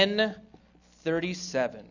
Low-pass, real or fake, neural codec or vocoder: 7.2 kHz; real; none